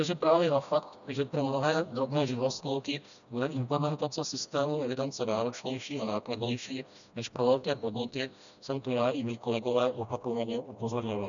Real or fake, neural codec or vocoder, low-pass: fake; codec, 16 kHz, 1 kbps, FreqCodec, smaller model; 7.2 kHz